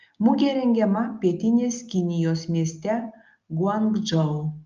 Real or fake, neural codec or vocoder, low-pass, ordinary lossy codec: real; none; 7.2 kHz; Opus, 24 kbps